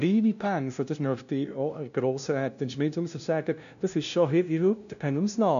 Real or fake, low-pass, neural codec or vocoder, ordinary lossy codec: fake; 7.2 kHz; codec, 16 kHz, 0.5 kbps, FunCodec, trained on LibriTTS, 25 frames a second; none